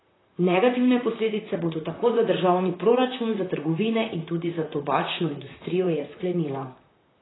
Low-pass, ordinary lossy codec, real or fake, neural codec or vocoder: 7.2 kHz; AAC, 16 kbps; fake; vocoder, 44.1 kHz, 128 mel bands, Pupu-Vocoder